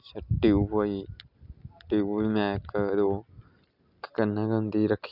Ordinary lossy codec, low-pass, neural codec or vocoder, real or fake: none; 5.4 kHz; none; real